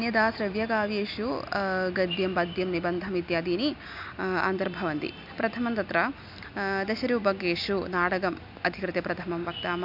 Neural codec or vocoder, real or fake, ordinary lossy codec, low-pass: none; real; MP3, 48 kbps; 5.4 kHz